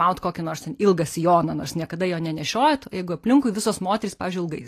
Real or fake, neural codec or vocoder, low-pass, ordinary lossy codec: real; none; 14.4 kHz; AAC, 48 kbps